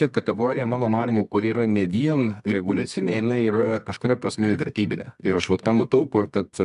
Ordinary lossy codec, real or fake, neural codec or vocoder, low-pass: AAC, 96 kbps; fake; codec, 24 kHz, 0.9 kbps, WavTokenizer, medium music audio release; 10.8 kHz